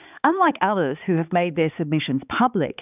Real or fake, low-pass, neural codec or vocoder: fake; 3.6 kHz; codec, 16 kHz, 4 kbps, X-Codec, HuBERT features, trained on balanced general audio